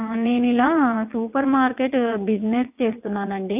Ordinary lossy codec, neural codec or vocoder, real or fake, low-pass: none; vocoder, 22.05 kHz, 80 mel bands, WaveNeXt; fake; 3.6 kHz